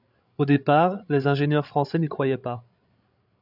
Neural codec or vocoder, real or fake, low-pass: codec, 16 kHz, 16 kbps, FreqCodec, larger model; fake; 5.4 kHz